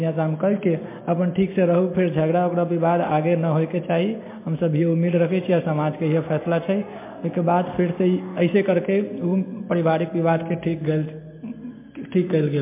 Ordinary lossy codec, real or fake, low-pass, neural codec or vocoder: MP3, 24 kbps; real; 3.6 kHz; none